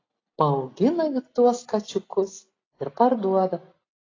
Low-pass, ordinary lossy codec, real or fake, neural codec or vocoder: 7.2 kHz; AAC, 32 kbps; real; none